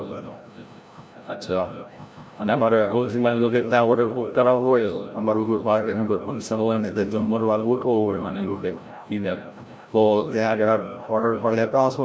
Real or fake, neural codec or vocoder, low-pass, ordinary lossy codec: fake; codec, 16 kHz, 0.5 kbps, FreqCodec, larger model; none; none